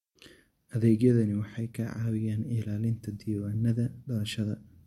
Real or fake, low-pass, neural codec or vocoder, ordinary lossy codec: real; 19.8 kHz; none; MP3, 64 kbps